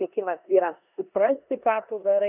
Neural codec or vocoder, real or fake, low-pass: codec, 16 kHz in and 24 kHz out, 0.9 kbps, LongCat-Audio-Codec, four codebook decoder; fake; 3.6 kHz